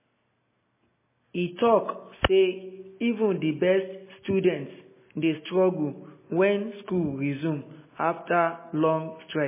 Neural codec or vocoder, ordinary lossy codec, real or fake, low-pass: none; MP3, 16 kbps; real; 3.6 kHz